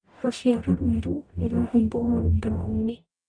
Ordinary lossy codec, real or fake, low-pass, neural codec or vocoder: none; fake; 9.9 kHz; codec, 44.1 kHz, 0.9 kbps, DAC